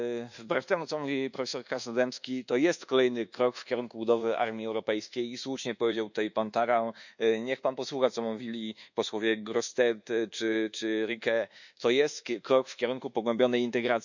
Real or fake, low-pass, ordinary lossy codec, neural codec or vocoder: fake; 7.2 kHz; none; codec, 24 kHz, 1.2 kbps, DualCodec